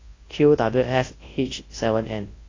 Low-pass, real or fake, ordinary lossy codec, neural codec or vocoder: 7.2 kHz; fake; AAC, 32 kbps; codec, 24 kHz, 0.9 kbps, WavTokenizer, large speech release